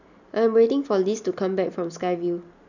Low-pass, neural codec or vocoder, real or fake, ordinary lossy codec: 7.2 kHz; none; real; none